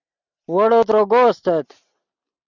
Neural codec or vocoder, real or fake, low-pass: none; real; 7.2 kHz